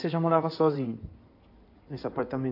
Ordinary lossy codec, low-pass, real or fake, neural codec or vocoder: AAC, 32 kbps; 5.4 kHz; fake; codec, 16 kHz in and 24 kHz out, 2.2 kbps, FireRedTTS-2 codec